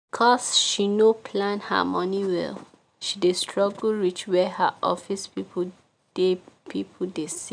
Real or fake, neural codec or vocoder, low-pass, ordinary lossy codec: real; none; 9.9 kHz; none